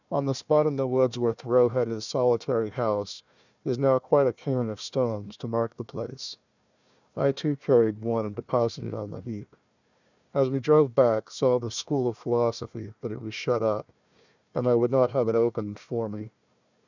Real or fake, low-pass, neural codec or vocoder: fake; 7.2 kHz; codec, 16 kHz, 1 kbps, FunCodec, trained on Chinese and English, 50 frames a second